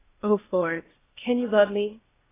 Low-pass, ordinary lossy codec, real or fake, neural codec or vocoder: 3.6 kHz; AAC, 16 kbps; fake; codec, 16 kHz, 0.8 kbps, ZipCodec